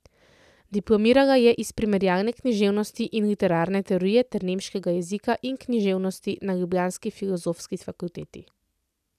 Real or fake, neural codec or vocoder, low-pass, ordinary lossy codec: real; none; 14.4 kHz; none